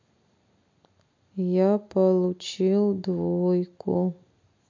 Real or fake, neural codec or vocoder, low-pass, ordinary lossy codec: real; none; 7.2 kHz; MP3, 48 kbps